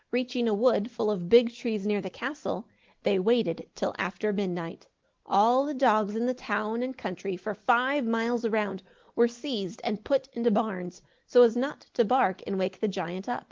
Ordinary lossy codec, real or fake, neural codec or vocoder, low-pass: Opus, 16 kbps; real; none; 7.2 kHz